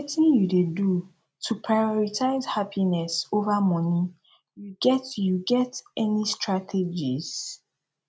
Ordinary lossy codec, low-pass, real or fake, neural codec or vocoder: none; none; real; none